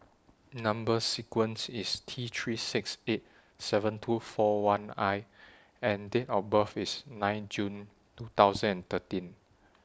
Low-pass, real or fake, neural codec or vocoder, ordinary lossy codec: none; real; none; none